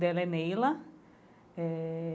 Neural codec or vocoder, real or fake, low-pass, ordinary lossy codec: none; real; none; none